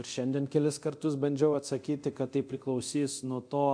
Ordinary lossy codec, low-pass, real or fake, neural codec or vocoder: MP3, 64 kbps; 9.9 kHz; fake; codec, 24 kHz, 0.9 kbps, DualCodec